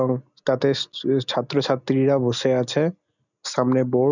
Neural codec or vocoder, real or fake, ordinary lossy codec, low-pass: none; real; none; 7.2 kHz